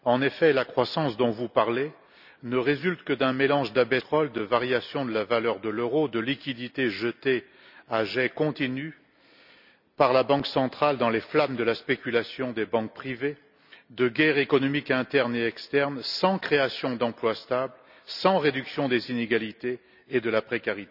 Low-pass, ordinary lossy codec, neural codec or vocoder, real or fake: 5.4 kHz; none; none; real